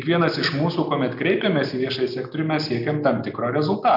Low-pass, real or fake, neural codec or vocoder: 5.4 kHz; real; none